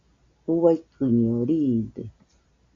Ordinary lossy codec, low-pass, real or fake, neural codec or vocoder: AAC, 48 kbps; 7.2 kHz; real; none